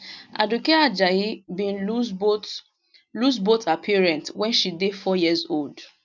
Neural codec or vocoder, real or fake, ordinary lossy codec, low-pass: none; real; none; 7.2 kHz